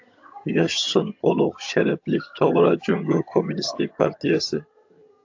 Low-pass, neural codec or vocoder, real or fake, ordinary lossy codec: 7.2 kHz; vocoder, 22.05 kHz, 80 mel bands, HiFi-GAN; fake; AAC, 48 kbps